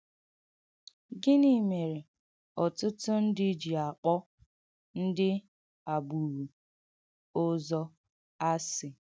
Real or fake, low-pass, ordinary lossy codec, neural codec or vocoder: real; none; none; none